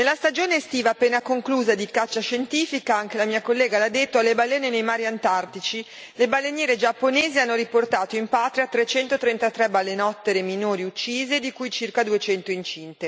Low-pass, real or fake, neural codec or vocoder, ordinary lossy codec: none; real; none; none